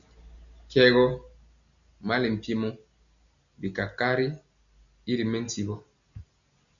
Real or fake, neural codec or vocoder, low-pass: real; none; 7.2 kHz